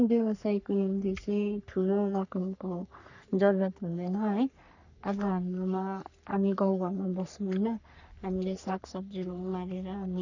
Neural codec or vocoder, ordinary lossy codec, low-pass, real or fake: codec, 44.1 kHz, 3.4 kbps, Pupu-Codec; none; 7.2 kHz; fake